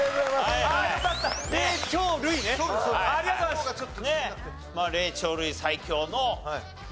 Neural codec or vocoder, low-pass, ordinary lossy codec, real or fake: none; none; none; real